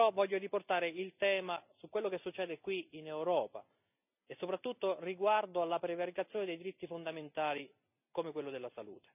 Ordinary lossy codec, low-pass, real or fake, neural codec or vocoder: none; 3.6 kHz; real; none